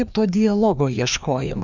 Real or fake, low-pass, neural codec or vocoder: fake; 7.2 kHz; codec, 16 kHz, 2 kbps, FreqCodec, larger model